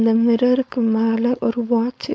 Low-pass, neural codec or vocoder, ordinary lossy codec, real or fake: none; codec, 16 kHz, 4.8 kbps, FACodec; none; fake